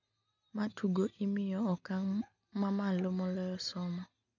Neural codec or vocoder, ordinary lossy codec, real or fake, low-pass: none; none; real; 7.2 kHz